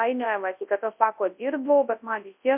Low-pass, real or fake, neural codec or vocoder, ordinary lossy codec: 3.6 kHz; fake; codec, 24 kHz, 0.9 kbps, WavTokenizer, large speech release; MP3, 32 kbps